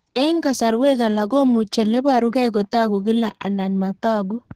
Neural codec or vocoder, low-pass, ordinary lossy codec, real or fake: codec, 32 kHz, 1.9 kbps, SNAC; 14.4 kHz; Opus, 16 kbps; fake